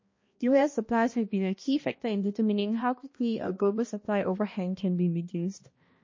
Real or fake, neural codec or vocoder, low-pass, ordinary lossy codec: fake; codec, 16 kHz, 1 kbps, X-Codec, HuBERT features, trained on balanced general audio; 7.2 kHz; MP3, 32 kbps